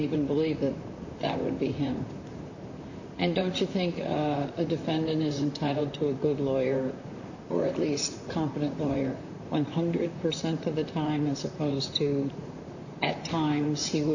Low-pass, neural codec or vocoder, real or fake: 7.2 kHz; vocoder, 44.1 kHz, 128 mel bands, Pupu-Vocoder; fake